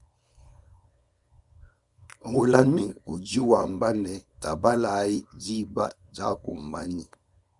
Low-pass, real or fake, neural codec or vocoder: 10.8 kHz; fake; codec, 24 kHz, 0.9 kbps, WavTokenizer, small release